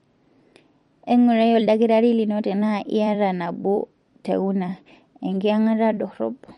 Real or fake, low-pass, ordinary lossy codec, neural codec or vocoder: fake; 19.8 kHz; MP3, 48 kbps; vocoder, 44.1 kHz, 128 mel bands every 256 samples, BigVGAN v2